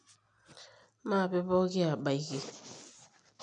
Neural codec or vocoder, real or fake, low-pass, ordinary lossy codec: none; real; 9.9 kHz; none